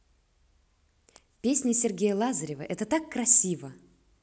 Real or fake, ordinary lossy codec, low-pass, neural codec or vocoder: real; none; none; none